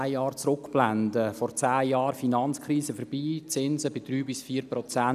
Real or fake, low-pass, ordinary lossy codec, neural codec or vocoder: real; 14.4 kHz; none; none